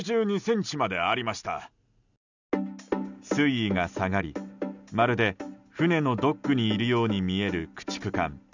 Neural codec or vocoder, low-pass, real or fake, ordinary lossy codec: none; 7.2 kHz; real; none